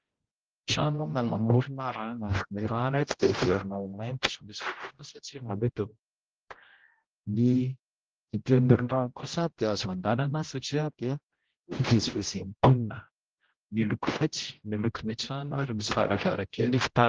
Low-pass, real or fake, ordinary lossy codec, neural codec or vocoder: 7.2 kHz; fake; Opus, 16 kbps; codec, 16 kHz, 0.5 kbps, X-Codec, HuBERT features, trained on general audio